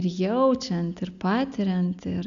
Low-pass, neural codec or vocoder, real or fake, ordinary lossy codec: 7.2 kHz; none; real; MP3, 96 kbps